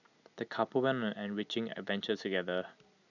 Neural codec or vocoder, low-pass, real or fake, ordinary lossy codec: none; 7.2 kHz; real; none